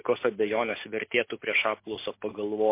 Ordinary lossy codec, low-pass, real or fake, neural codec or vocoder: MP3, 24 kbps; 3.6 kHz; real; none